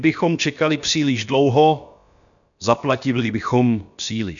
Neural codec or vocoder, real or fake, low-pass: codec, 16 kHz, about 1 kbps, DyCAST, with the encoder's durations; fake; 7.2 kHz